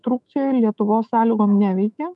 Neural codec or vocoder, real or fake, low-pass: autoencoder, 48 kHz, 128 numbers a frame, DAC-VAE, trained on Japanese speech; fake; 10.8 kHz